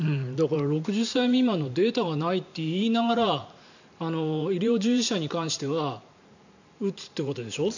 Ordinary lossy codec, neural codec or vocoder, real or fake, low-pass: none; vocoder, 44.1 kHz, 128 mel bands every 512 samples, BigVGAN v2; fake; 7.2 kHz